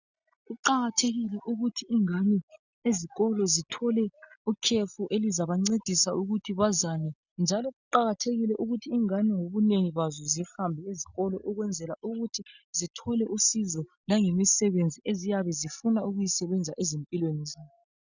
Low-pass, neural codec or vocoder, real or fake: 7.2 kHz; none; real